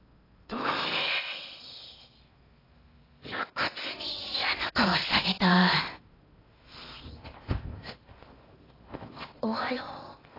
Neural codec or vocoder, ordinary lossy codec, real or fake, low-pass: codec, 16 kHz in and 24 kHz out, 0.8 kbps, FocalCodec, streaming, 65536 codes; AAC, 24 kbps; fake; 5.4 kHz